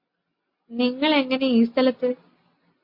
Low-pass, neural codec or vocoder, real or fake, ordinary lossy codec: 5.4 kHz; none; real; MP3, 32 kbps